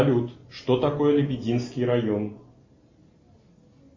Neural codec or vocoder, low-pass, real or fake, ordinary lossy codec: none; 7.2 kHz; real; MP3, 32 kbps